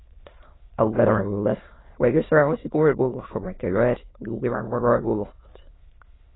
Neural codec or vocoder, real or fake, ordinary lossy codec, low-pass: autoencoder, 22.05 kHz, a latent of 192 numbers a frame, VITS, trained on many speakers; fake; AAC, 16 kbps; 7.2 kHz